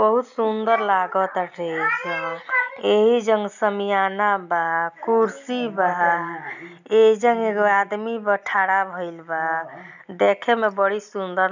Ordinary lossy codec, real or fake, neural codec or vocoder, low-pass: none; real; none; 7.2 kHz